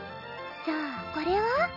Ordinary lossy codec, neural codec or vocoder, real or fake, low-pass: none; none; real; 5.4 kHz